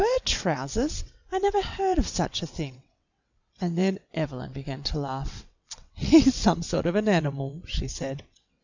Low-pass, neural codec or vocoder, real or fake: 7.2 kHz; vocoder, 22.05 kHz, 80 mel bands, WaveNeXt; fake